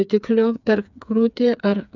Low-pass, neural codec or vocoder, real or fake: 7.2 kHz; codec, 16 kHz, 4 kbps, FreqCodec, smaller model; fake